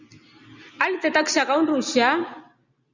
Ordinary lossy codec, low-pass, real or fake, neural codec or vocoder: Opus, 64 kbps; 7.2 kHz; real; none